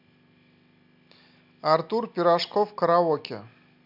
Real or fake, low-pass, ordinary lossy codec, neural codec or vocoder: real; 5.4 kHz; MP3, 48 kbps; none